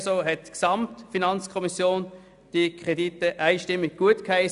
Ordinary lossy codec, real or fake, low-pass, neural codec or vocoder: AAC, 96 kbps; real; 10.8 kHz; none